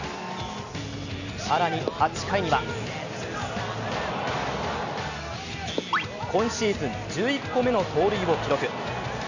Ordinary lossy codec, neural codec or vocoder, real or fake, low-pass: none; none; real; 7.2 kHz